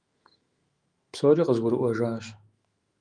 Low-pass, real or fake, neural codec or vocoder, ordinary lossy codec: 9.9 kHz; fake; autoencoder, 48 kHz, 128 numbers a frame, DAC-VAE, trained on Japanese speech; Opus, 32 kbps